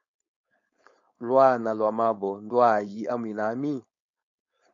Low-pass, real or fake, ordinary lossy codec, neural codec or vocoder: 7.2 kHz; fake; MP3, 48 kbps; codec, 16 kHz, 4.8 kbps, FACodec